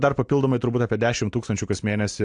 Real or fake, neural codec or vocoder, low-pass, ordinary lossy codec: real; none; 9.9 kHz; AAC, 64 kbps